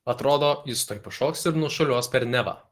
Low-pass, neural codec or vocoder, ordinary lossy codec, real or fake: 14.4 kHz; none; Opus, 16 kbps; real